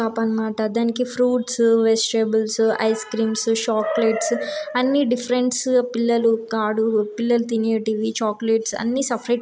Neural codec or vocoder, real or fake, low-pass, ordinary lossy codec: none; real; none; none